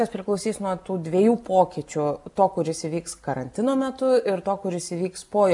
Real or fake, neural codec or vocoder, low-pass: real; none; 10.8 kHz